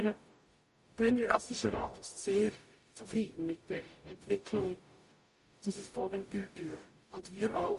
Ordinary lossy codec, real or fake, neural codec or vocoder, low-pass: MP3, 48 kbps; fake; codec, 44.1 kHz, 0.9 kbps, DAC; 14.4 kHz